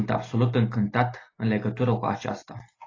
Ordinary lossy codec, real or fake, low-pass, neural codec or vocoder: AAC, 32 kbps; real; 7.2 kHz; none